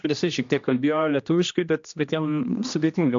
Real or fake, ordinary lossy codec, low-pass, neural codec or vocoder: fake; AAC, 64 kbps; 7.2 kHz; codec, 16 kHz, 1 kbps, X-Codec, HuBERT features, trained on general audio